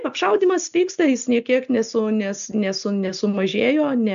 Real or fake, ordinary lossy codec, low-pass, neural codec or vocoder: real; AAC, 96 kbps; 7.2 kHz; none